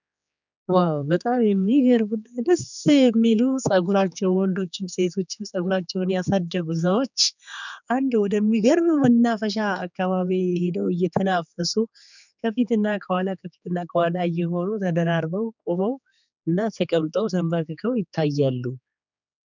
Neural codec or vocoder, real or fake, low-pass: codec, 16 kHz, 4 kbps, X-Codec, HuBERT features, trained on general audio; fake; 7.2 kHz